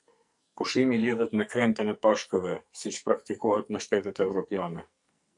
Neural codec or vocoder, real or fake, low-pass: codec, 44.1 kHz, 2.6 kbps, SNAC; fake; 10.8 kHz